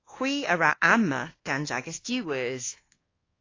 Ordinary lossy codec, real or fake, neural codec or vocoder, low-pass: AAC, 32 kbps; fake; codec, 24 kHz, 1.2 kbps, DualCodec; 7.2 kHz